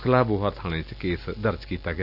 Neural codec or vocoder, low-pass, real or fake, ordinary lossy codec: none; 5.4 kHz; real; none